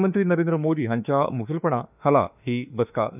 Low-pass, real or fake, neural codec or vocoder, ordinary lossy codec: 3.6 kHz; fake; autoencoder, 48 kHz, 32 numbers a frame, DAC-VAE, trained on Japanese speech; none